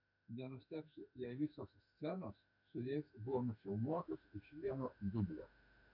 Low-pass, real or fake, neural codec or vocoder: 5.4 kHz; fake; autoencoder, 48 kHz, 32 numbers a frame, DAC-VAE, trained on Japanese speech